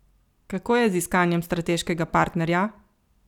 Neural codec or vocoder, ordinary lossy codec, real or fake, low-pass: none; none; real; 19.8 kHz